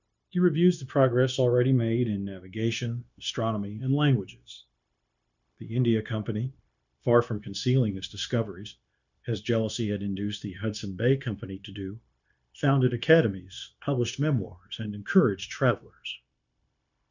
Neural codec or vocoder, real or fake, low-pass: codec, 16 kHz, 0.9 kbps, LongCat-Audio-Codec; fake; 7.2 kHz